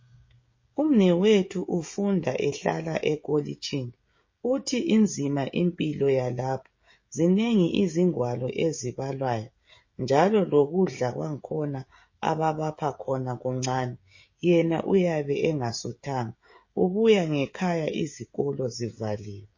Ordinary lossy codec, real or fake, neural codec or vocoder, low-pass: MP3, 32 kbps; fake; codec, 16 kHz, 16 kbps, FreqCodec, smaller model; 7.2 kHz